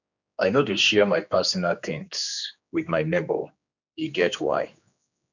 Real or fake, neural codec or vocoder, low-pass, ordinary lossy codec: fake; codec, 16 kHz, 2 kbps, X-Codec, HuBERT features, trained on general audio; 7.2 kHz; none